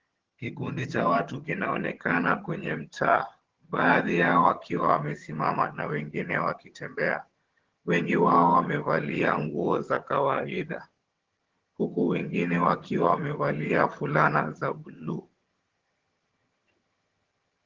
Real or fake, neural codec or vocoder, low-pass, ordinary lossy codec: fake; vocoder, 22.05 kHz, 80 mel bands, HiFi-GAN; 7.2 kHz; Opus, 16 kbps